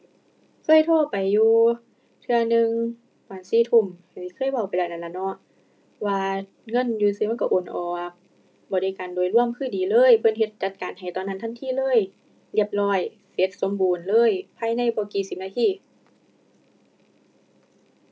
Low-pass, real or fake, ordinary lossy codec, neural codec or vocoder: none; real; none; none